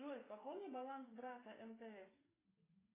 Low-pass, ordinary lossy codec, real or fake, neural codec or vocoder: 3.6 kHz; AAC, 16 kbps; fake; codec, 16 kHz, 8 kbps, FreqCodec, smaller model